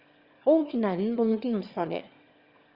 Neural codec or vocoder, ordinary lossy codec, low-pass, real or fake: autoencoder, 22.05 kHz, a latent of 192 numbers a frame, VITS, trained on one speaker; Opus, 32 kbps; 5.4 kHz; fake